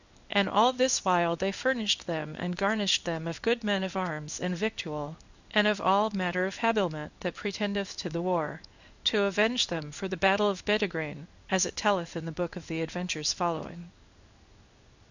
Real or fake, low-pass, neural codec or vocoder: fake; 7.2 kHz; codec, 16 kHz in and 24 kHz out, 1 kbps, XY-Tokenizer